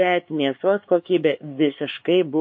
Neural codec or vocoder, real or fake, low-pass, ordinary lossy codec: codec, 16 kHz, 4 kbps, X-Codec, HuBERT features, trained on LibriSpeech; fake; 7.2 kHz; MP3, 32 kbps